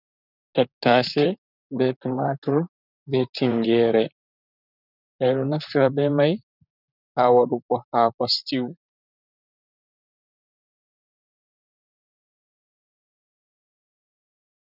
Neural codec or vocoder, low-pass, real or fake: vocoder, 44.1 kHz, 128 mel bands, Pupu-Vocoder; 5.4 kHz; fake